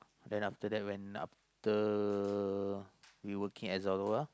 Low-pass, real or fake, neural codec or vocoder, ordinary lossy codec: none; real; none; none